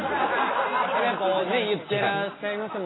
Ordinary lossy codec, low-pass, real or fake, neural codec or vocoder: AAC, 16 kbps; 7.2 kHz; real; none